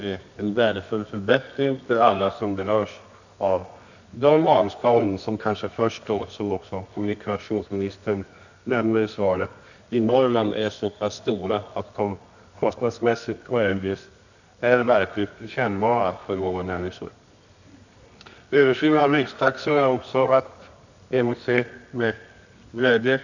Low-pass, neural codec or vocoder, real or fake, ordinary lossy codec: 7.2 kHz; codec, 24 kHz, 0.9 kbps, WavTokenizer, medium music audio release; fake; none